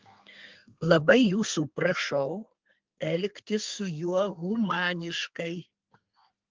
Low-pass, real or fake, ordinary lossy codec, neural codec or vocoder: 7.2 kHz; fake; Opus, 32 kbps; codec, 32 kHz, 1.9 kbps, SNAC